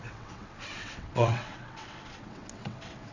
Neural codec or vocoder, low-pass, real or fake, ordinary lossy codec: vocoder, 22.05 kHz, 80 mel bands, WaveNeXt; 7.2 kHz; fake; none